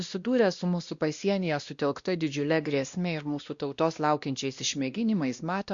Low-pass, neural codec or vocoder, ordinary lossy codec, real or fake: 7.2 kHz; codec, 16 kHz, 1 kbps, X-Codec, WavLM features, trained on Multilingual LibriSpeech; Opus, 64 kbps; fake